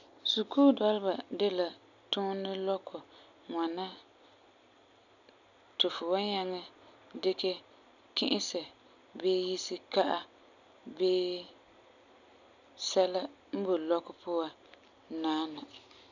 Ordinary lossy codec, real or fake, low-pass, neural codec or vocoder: none; real; 7.2 kHz; none